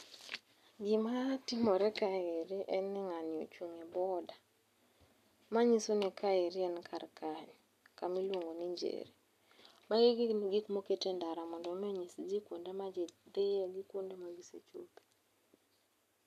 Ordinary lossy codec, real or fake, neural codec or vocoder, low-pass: none; real; none; 14.4 kHz